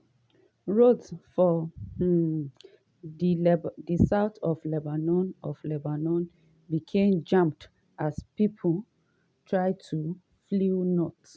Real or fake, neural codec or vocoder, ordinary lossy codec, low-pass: real; none; none; none